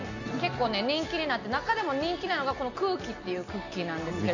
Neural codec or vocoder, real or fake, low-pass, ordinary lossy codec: none; real; 7.2 kHz; none